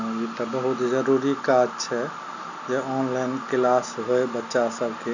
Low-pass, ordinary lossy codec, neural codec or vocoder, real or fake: 7.2 kHz; none; none; real